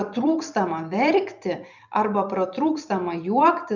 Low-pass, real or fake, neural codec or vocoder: 7.2 kHz; fake; vocoder, 44.1 kHz, 128 mel bands every 256 samples, BigVGAN v2